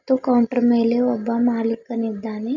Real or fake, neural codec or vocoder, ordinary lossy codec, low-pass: real; none; none; 7.2 kHz